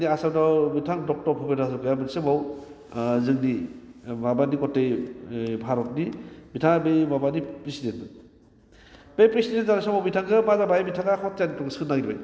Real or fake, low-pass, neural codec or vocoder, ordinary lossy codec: real; none; none; none